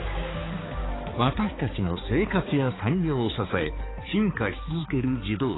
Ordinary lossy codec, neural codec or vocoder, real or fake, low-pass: AAC, 16 kbps; codec, 16 kHz, 4 kbps, X-Codec, HuBERT features, trained on balanced general audio; fake; 7.2 kHz